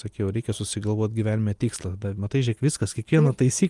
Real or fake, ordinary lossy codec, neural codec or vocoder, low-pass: real; Opus, 32 kbps; none; 10.8 kHz